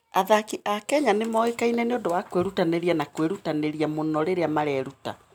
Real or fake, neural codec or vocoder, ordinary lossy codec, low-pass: real; none; none; none